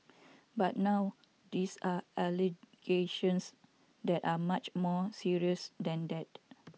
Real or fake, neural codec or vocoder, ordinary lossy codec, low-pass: real; none; none; none